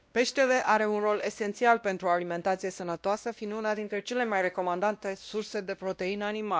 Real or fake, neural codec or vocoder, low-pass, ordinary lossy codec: fake; codec, 16 kHz, 1 kbps, X-Codec, WavLM features, trained on Multilingual LibriSpeech; none; none